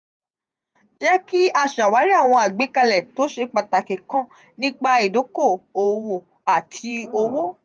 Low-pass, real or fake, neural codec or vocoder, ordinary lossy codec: 9.9 kHz; fake; vocoder, 48 kHz, 128 mel bands, Vocos; none